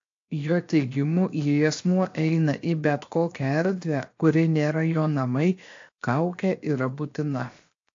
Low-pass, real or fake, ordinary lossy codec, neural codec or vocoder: 7.2 kHz; fake; AAC, 48 kbps; codec, 16 kHz, 0.7 kbps, FocalCodec